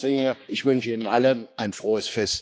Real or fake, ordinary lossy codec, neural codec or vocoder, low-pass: fake; none; codec, 16 kHz, 2 kbps, X-Codec, HuBERT features, trained on general audio; none